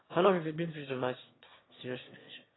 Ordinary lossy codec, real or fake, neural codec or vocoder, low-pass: AAC, 16 kbps; fake; autoencoder, 22.05 kHz, a latent of 192 numbers a frame, VITS, trained on one speaker; 7.2 kHz